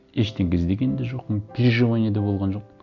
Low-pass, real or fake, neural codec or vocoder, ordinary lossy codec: 7.2 kHz; real; none; none